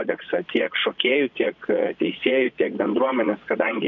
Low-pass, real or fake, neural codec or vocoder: 7.2 kHz; fake; vocoder, 22.05 kHz, 80 mel bands, Vocos